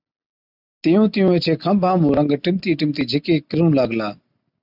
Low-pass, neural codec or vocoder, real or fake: 5.4 kHz; none; real